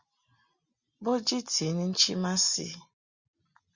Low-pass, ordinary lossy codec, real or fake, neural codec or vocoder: 7.2 kHz; Opus, 64 kbps; real; none